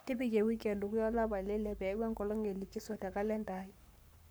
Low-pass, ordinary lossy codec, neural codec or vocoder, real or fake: none; none; codec, 44.1 kHz, 7.8 kbps, Pupu-Codec; fake